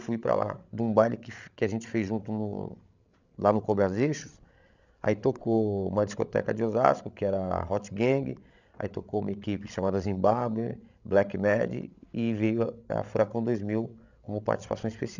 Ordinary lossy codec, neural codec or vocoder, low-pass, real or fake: none; codec, 16 kHz, 16 kbps, FreqCodec, larger model; 7.2 kHz; fake